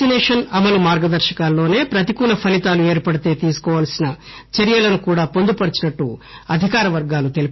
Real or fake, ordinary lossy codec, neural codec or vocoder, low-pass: real; MP3, 24 kbps; none; 7.2 kHz